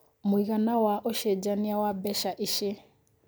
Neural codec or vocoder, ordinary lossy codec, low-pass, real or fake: none; none; none; real